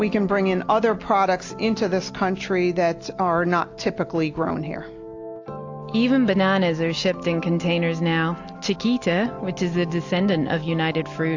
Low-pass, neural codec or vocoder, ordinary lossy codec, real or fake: 7.2 kHz; none; AAC, 48 kbps; real